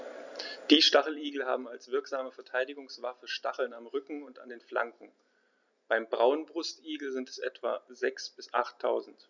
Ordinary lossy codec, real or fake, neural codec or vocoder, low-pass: none; real; none; 7.2 kHz